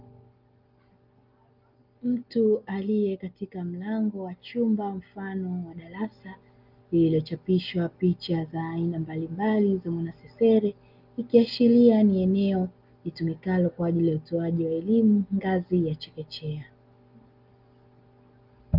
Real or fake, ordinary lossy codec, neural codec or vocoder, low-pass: real; Opus, 32 kbps; none; 5.4 kHz